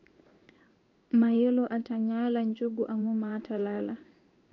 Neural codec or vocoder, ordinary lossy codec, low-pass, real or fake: codec, 16 kHz in and 24 kHz out, 1 kbps, XY-Tokenizer; MP3, 48 kbps; 7.2 kHz; fake